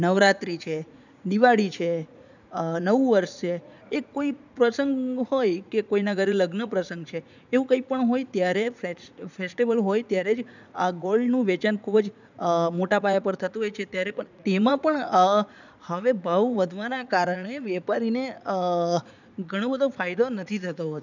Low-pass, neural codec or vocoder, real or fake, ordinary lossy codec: 7.2 kHz; vocoder, 44.1 kHz, 80 mel bands, Vocos; fake; none